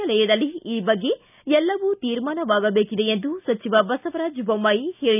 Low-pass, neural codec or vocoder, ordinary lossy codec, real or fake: 3.6 kHz; none; none; real